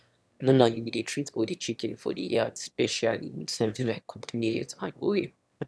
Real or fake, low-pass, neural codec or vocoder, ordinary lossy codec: fake; none; autoencoder, 22.05 kHz, a latent of 192 numbers a frame, VITS, trained on one speaker; none